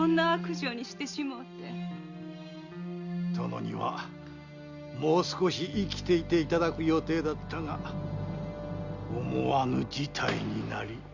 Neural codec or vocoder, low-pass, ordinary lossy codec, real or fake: none; 7.2 kHz; Opus, 64 kbps; real